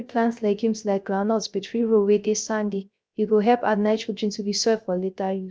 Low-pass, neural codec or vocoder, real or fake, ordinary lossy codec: none; codec, 16 kHz, 0.3 kbps, FocalCodec; fake; none